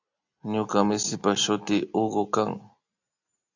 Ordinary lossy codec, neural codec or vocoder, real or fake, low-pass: AAC, 48 kbps; none; real; 7.2 kHz